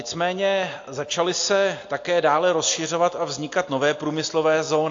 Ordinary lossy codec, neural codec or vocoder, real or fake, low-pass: AAC, 48 kbps; none; real; 7.2 kHz